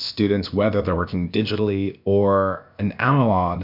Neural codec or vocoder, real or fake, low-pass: codec, 16 kHz, about 1 kbps, DyCAST, with the encoder's durations; fake; 5.4 kHz